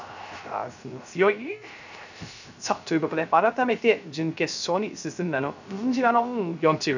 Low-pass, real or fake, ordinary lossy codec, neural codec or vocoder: 7.2 kHz; fake; none; codec, 16 kHz, 0.3 kbps, FocalCodec